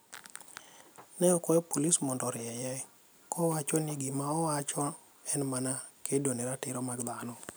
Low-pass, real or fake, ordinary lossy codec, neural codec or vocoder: none; real; none; none